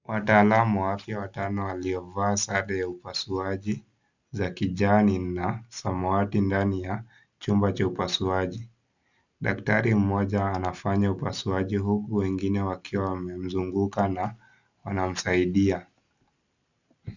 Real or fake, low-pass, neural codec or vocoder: real; 7.2 kHz; none